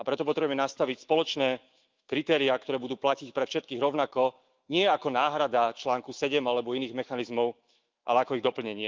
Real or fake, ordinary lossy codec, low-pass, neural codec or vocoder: fake; Opus, 32 kbps; 7.2 kHz; autoencoder, 48 kHz, 128 numbers a frame, DAC-VAE, trained on Japanese speech